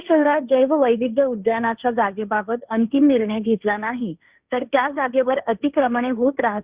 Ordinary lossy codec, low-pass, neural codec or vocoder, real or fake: Opus, 64 kbps; 3.6 kHz; codec, 16 kHz, 1.1 kbps, Voila-Tokenizer; fake